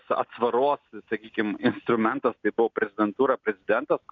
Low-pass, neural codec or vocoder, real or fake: 7.2 kHz; none; real